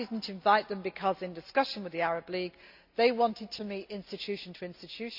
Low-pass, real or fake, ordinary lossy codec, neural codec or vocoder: 5.4 kHz; real; MP3, 48 kbps; none